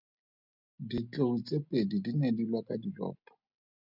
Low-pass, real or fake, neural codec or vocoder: 5.4 kHz; real; none